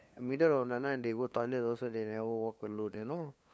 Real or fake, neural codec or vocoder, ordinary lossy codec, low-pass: fake; codec, 16 kHz, 2 kbps, FunCodec, trained on LibriTTS, 25 frames a second; none; none